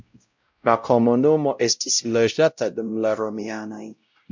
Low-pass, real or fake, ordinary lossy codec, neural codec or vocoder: 7.2 kHz; fake; MP3, 64 kbps; codec, 16 kHz, 0.5 kbps, X-Codec, WavLM features, trained on Multilingual LibriSpeech